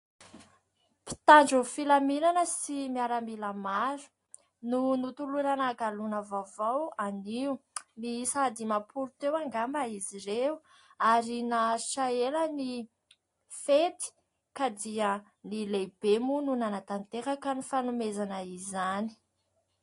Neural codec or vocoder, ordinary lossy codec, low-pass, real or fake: none; AAC, 48 kbps; 10.8 kHz; real